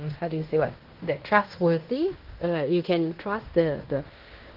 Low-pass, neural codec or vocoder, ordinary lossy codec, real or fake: 5.4 kHz; codec, 16 kHz in and 24 kHz out, 0.9 kbps, LongCat-Audio-Codec, fine tuned four codebook decoder; Opus, 16 kbps; fake